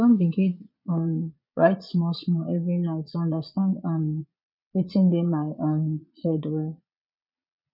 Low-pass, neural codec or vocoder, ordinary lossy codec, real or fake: 5.4 kHz; vocoder, 22.05 kHz, 80 mel bands, Vocos; none; fake